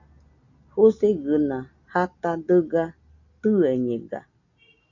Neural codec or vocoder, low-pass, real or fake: none; 7.2 kHz; real